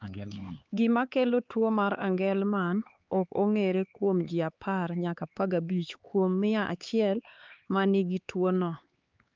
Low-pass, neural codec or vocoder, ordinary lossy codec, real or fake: 7.2 kHz; codec, 16 kHz, 4 kbps, X-Codec, HuBERT features, trained on LibriSpeech; Opus, 32 kbps; fake